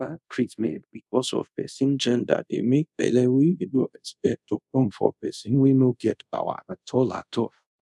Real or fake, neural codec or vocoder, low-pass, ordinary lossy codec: fake; codec, 24 kHz, 0.5 kbps, DualCodec; none; none